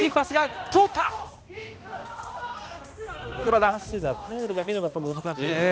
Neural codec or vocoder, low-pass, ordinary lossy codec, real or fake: codec, 16 kHz, 1 kbps, X-Codec, HuBERT features, trained on balanced general audio; none; none; fake